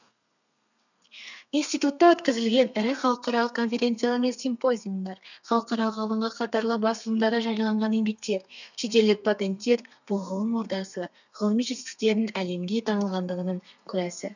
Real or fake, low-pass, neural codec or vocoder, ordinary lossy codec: fake; 7.2 kHz; codec, 32 kHz, 1.9 kbps, SNAC; none